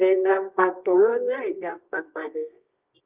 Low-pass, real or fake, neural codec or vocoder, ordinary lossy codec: 3.6 kHz; fake; codec, 24 kHz, 0.9 kbps, WavTokenizer, medium music audio release; Opus, 24 kbps